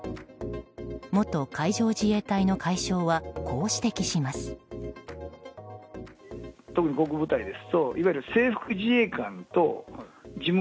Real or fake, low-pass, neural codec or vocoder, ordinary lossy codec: real; none; none; none